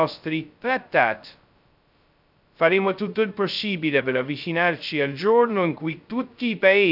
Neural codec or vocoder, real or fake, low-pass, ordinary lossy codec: codec, 16 kHz, 0.2 kbps, FocalCodec; fake; 5.4 kHz; none